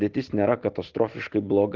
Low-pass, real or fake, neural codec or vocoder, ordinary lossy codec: 7.2 kHz; real; none; Opus, 16 kbps